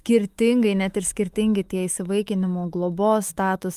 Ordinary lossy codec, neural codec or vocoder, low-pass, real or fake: Opus, 32 kbps; autoencoder, 48 kHz, 128 numbers a frame, DAC-VAE, trained on Japanese speech; 14.4 kHz; fake